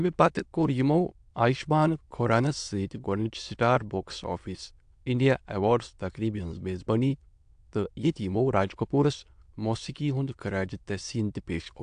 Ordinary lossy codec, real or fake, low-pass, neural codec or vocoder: AAC, 64 kbps; fake; 9.9 kHz; autoencoder, 22.05 kHz, a latent of 192 numbers a frame, VITS, trained on many speakers